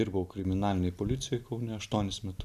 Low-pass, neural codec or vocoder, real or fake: 14.4 kHz; none; real